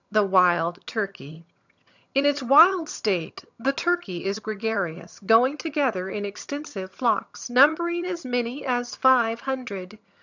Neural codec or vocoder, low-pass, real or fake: vocoder, 22.05 kHz, 80 mel bands, HiFi-GAN; 7.2 kHz; fake